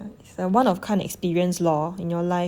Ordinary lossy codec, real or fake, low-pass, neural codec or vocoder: Opus, 64 kbps; real; 19.8 kHz; none